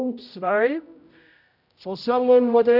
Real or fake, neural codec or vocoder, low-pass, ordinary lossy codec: fake; codec, 16 kHz, 0.5 kbps, X-Codec, HuBERT features, trained on general audio; 5.4 kHz; none